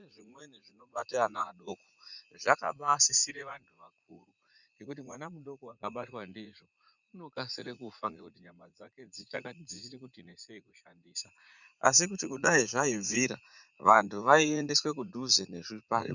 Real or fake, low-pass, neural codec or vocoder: fake; 7.2 kHz; vocoder, 44.1 kHz, 80 mel bands, Vocos